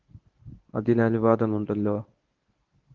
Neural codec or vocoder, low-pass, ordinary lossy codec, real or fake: codec, 24 kHz, 0.9 kbps, WavTokenizer, medium speech release version 1; 7.2 kHz; Opus, 16 kbps; fake